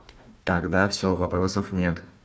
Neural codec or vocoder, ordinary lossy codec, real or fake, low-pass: codec, 16 kHz, 1 kbps, FunCodec, trained on Chinese and English, 50 frames a second; none; fake; none